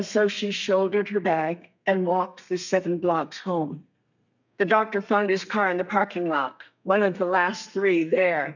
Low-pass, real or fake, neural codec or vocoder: 7.2 kHz; fake; codec, 32 kHz, 1.9 kbps, SNAC